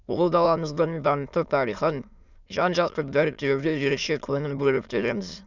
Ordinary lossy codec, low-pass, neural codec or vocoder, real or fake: none; 7.2 kHz; autoencoder, 22.05 kHz, a latent of 192 numbers a frame, VITS, trained on many speakers; fake